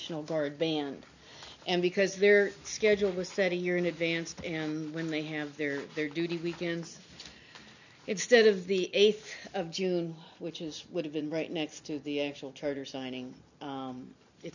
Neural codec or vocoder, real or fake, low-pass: none; real; 7.2 kHz